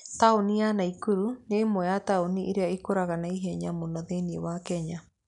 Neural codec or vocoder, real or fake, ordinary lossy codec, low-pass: none; real; none; 10.8 kHz